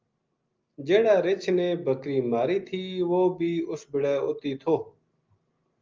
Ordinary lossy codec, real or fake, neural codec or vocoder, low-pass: Opus, 24 kbps; real; none; 7.2 kHz